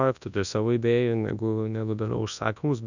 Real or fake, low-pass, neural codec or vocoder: fake; 7.2 kHz; codec, 24 kHz, 0.9 kbps, WavTokenizer, large speech release